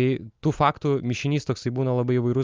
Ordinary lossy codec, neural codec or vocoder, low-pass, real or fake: Opus, 32 kbps; none; 7.2 kHz; real